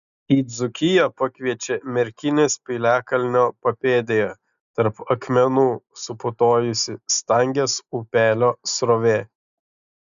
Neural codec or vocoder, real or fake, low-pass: none; real; 7.2 kHz